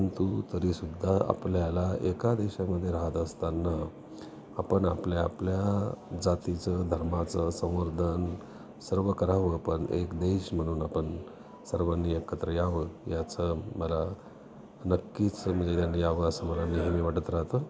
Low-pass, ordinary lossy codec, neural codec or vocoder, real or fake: none; none; none; real